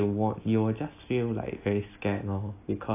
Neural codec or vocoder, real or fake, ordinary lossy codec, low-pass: none; real; AAC, 24 kbps; 3.6 kHz